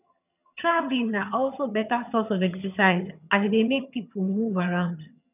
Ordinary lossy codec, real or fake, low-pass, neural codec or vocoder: none; fake; 3.6 kHz; vocoder, 22.05 kHz, 80 mel bands, HiFi-GAN